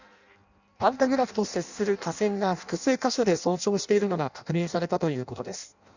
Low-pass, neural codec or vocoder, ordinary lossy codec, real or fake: 7.2 kHz; codec, 16 kHz in and 24 kHz out, 0.6 kbps, FireRedTTS-2 codec; none; fake